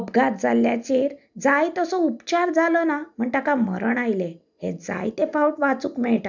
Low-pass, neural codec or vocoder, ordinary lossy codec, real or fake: 7.2 kHz; none; none; real